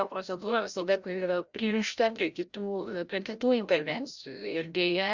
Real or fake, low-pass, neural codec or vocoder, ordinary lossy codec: fake; 7.2 kHz; codec, 16 kHz, 0.5 kbps, FreqCodec, larger model; Opus, 64 kbps